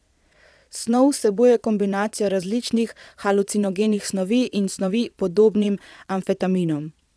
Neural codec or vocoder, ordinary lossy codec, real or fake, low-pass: vocoder, 22.05 kHz, 80 mel bands, Vocos; none; fake; none